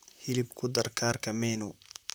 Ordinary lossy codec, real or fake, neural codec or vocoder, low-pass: none; fake; vocoder, 44.1 kHz, 128 mel bands, Pupu-Vocoder; none